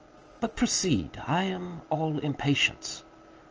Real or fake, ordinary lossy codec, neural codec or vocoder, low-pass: real; Opus, 24 kbps; none; 7.2 kHz